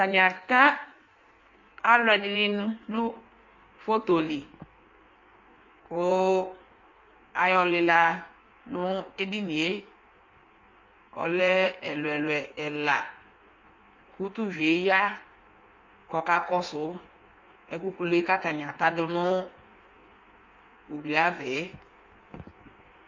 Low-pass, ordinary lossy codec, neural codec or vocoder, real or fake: 7.2 kHz; MP3, 48 kbps; codec, 16 kHz in and 24 kHz out, 1.1 kbps, FireRedTTS-2 codec; fake